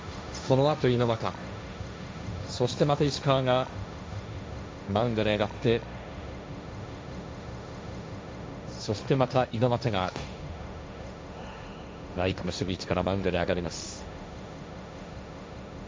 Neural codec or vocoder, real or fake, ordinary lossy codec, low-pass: codec, 16 kHz, 1.1 kbps, Voila-Tokenizer; fake; none; none